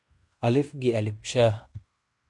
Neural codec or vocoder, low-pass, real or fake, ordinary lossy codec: codec, 16 kHz in and 24 kHz out, 0.9 kbps, LongCat-Audio-Codec, fine tuned four codebook decoder; 10.8 kHz; fake; MP3, 64 kbps